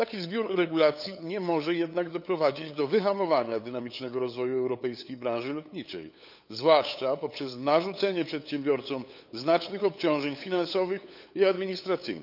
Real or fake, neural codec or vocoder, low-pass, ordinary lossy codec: fake; codec, 16 kHz, 8 kbps, FunCodec, trained on LibriTTS, 25 frames a second; 5.4 kHz; none